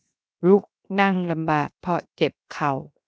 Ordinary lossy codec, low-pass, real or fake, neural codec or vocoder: none; none; fake; codec, 16 kHz, 0.7 kbps, FocalCodec